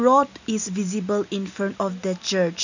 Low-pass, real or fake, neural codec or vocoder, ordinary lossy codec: 7.2 kHz; real; none; none